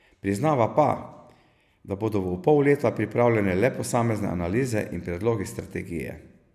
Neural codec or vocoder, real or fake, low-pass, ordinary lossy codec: none; real; 14.4 kHz; none